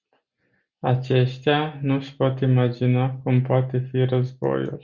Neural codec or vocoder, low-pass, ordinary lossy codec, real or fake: none; 7.2 kHz; Opus, 64 kbps; real